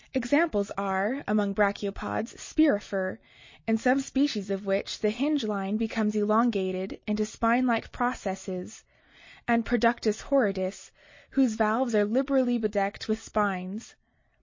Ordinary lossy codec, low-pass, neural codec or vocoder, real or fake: MP3, 32 kbps; 7.2 kHz; none; real